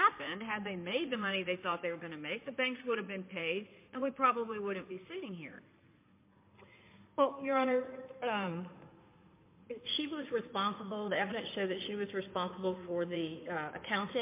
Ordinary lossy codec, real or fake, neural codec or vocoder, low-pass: MP3, 24 kbps; fake; codec, 16 kHz in and 24 kHz out, 2.2 kbps, FireRedTTS-2 codec; 3.6 kHz